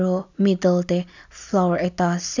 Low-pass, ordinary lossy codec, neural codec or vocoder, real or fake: 7.2 kHz; none; none; real